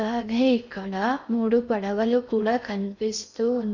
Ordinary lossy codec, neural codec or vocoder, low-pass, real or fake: none; codec, 16 kHz in and 24 kHz out, 0.6 kbps, FocalCodec, streaming, 4096 codes; 7.2 kHz; fake